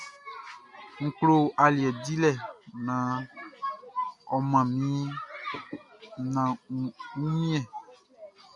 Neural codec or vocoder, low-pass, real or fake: none; 10.8 kHz; real